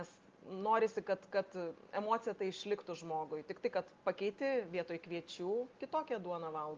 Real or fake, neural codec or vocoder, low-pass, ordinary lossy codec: real; none; 7.2 kHz; Opus, 32 kbps